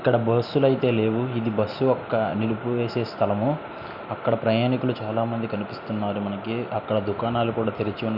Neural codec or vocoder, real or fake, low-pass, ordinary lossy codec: none; real; 5.4 kHz; none